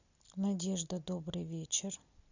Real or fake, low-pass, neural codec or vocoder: real; 7.2 kHz; none